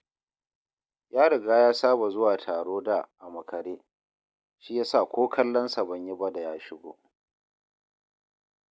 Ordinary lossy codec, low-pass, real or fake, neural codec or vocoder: none; none; real; none